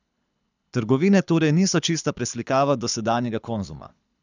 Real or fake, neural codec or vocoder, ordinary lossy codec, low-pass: fake; codec, 24 kHz, 6 kbps, HILCodec; none; 7.2 kHz